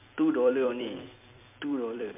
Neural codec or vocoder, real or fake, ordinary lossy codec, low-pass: vocoder, 44.1 kHz, 128 mel bands every 256 samples, BigVGAN v2; fake; MP3, 32 kbps; 3.6 kHz